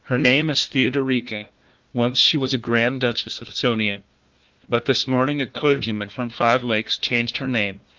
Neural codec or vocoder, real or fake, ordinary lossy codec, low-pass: codec, 16 kHz, 1 kbps, FunCodec, trained on Chinese and English, 50 frames a second; fake; Opus, 32 kbps; 7.2 kHz